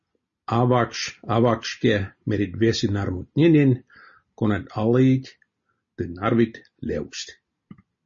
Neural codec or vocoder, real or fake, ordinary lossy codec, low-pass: none; real; MP3, 32 kbps; 7.2 kHz